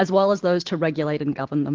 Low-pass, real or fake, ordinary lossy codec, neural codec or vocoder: 7.2 kHz; real; Opus, 16 kbps; none